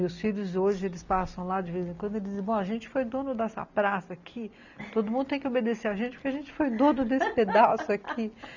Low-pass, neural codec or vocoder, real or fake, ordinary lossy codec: 7.2 kHz; none; real; none